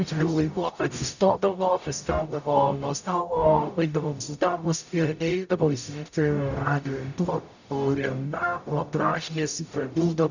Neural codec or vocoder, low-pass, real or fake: codec, 44.1 kHz, 0.9 kbps, DAC; 7.2 kHz; fake